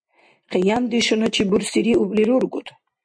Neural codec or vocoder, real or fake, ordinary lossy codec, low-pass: none; real; MP3, 64 kbps; 9.9 kHz